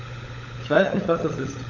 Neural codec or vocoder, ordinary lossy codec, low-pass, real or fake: codec, 16 kHz, 16 kbps, FunCodec, trained on LibriTTS, 50 frames a second; none; 7.2 kHz; fake